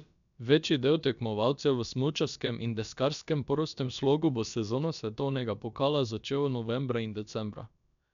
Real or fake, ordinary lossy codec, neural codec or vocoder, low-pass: fake; none; codec, 16 kHz, about 1 kbps, DyCAST, with the encoder's durations; 7.2 kHz